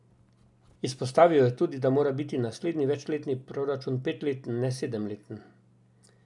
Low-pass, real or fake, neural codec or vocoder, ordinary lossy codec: 10.8 kHz; real; none; none